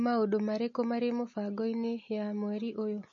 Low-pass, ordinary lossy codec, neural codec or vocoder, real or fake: 7.2 kHz; MP3, 32 kbps; none; real